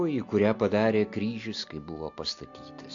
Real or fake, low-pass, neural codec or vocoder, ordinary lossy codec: real; 7.2 kHz; none; AAC, 48 kbps